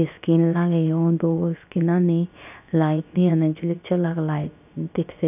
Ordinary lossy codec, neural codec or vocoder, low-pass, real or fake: none; codec, 16 kHz, about 1 kbps, DyCAST, with the encoder's durations; 3.6 kHz; fake